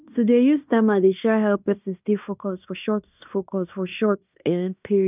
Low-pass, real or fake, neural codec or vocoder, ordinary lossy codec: 3.6 kHz; fake; codec, 16 kHz in and 24 kHz out, 0.9 kbps, LongCat-Audio-Codec, fine tuned four codebook decoder; none